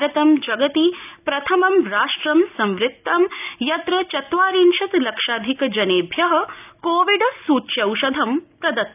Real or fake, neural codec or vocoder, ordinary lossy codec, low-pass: real; none; none; 3.6 kHz